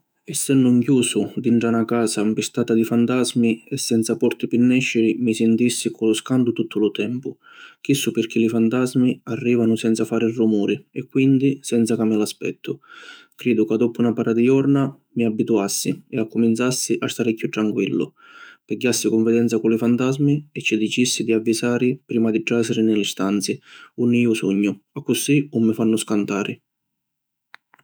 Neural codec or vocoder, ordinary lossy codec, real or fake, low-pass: autoencoder, 48 kHz, 128 numbers a frame, DAC-VAE, trained on Japanese speech; none; fake; none